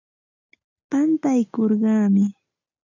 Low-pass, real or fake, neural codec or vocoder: 7.2 kHz; real; none